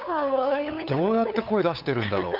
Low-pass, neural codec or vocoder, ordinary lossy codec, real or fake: 5.4 kHz; codec, 16 kHz, 8 kbps, FunCodec, trained on LibriTTS, 25 frames a second; none; fake